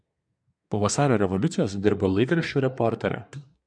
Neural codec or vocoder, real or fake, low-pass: codec, 24 kHz, 1 kbps, SNAC; fake; 9.9 kHz